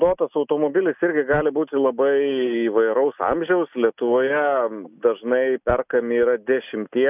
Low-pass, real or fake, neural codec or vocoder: 3.6 kHz; real; none